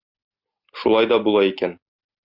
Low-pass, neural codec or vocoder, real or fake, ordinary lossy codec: 5.4 kHz; none; real; Opus, 64 kbps